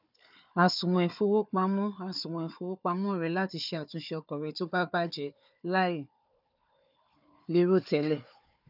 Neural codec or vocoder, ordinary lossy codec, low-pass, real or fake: codec, 16 kHz, 4 kbps, FunCodec, trained on Chinese and English, 50 frames a second; none; 5.4 kHz; fake